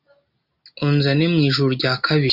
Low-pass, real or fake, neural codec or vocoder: 5.4 kHz; real; none